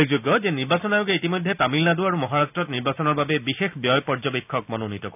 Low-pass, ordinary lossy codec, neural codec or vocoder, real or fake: 3.6 kHz; none; none; real